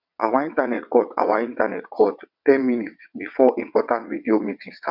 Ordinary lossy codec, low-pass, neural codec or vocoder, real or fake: none; 5.4 kHz; vocoder, 22.05 kHz, 80 mel bands, WaveNeXt; fake